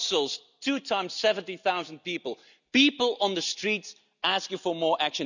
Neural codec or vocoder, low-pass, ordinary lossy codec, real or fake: none; 7.2 kHz; none; real